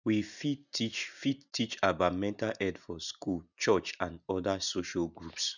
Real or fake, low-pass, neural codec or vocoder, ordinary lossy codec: real; 7.2 kHz; none; none